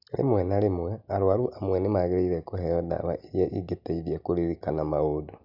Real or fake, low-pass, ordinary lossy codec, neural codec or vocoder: real; 5.4 kHz; none; none